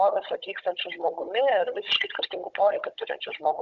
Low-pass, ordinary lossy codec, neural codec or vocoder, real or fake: 7.2 kHz; MP3, 64 kbps; codec, 16 kHz, 16 kbps, FunCodec, trained on Chinese and English, 50 frames a second; fake